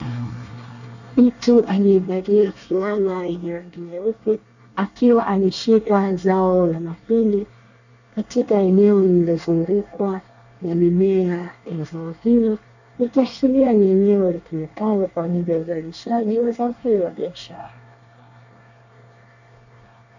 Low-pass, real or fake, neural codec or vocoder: 7.2 kHz; fake; codec, 24 kHz, 1 kbps, SNAC